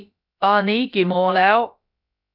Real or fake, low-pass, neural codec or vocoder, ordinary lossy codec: fake; 5.4 kHz; codec, 16 kHz, about 1 kbps, DyCAST, with the encoder's durations; none